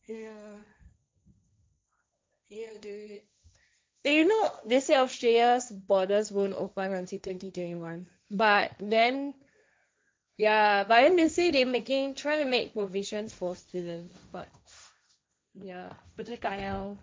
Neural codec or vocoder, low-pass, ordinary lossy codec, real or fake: codec, 16 kHz, 1.1 kbps, Voila-Tokenizer; none; none; fake